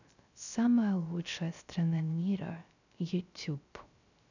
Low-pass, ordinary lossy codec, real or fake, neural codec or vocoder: 7.2 kHz; none; fake; codec, 16 kHz, 0.3 kbps, FocalCodec